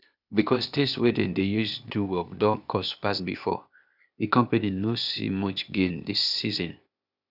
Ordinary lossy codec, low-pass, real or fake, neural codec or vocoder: none; 5.4 kHz; fake; codec, 16 kHz, 0.8 kbps, ZipCodec